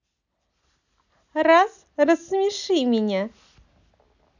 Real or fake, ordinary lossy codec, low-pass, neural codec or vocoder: real; none; 7.2 kHz; none